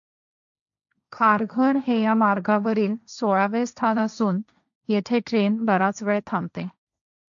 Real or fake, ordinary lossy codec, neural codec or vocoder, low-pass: fake; none; codec, 16 kHz, 1.1 kbps, Voila-Tokenizer; 7.2 kHz